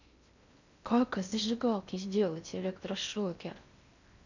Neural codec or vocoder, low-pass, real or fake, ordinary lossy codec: codec, 16 kHz in and 24 kHz out, 0.6 kbps, FocalCodec, streaming, 2048 codes; 7.2 kHz; fake; none